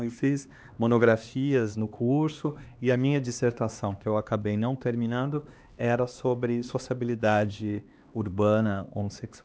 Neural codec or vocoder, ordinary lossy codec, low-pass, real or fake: codec, 16 kHz, 2 kbps, X-Codec, HuBERT features, trained on LibriSpeech; none; none; fake